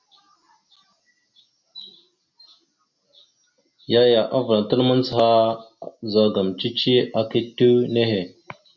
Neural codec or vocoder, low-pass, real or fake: none; 7.2 kHz; real